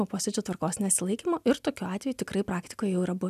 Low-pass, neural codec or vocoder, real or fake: 14.4 kHz; none; real